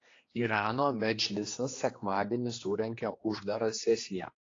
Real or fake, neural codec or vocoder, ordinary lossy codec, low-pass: fake; codec, 16 kHz, 2 kbps, X-Codec, HuBERT features, trained on general audio; AAC, 32 kbps; 7.2 kHz